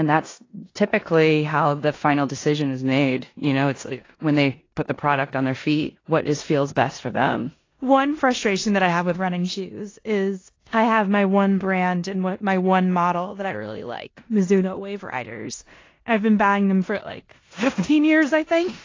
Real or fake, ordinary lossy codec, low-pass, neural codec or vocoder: fake; AAC, 32 kbps; 7.2 kHz; codec, 16 kHz in and 24 kHz out, 0.9 kbps, LongCat-Audio-Codec, four codebook decoder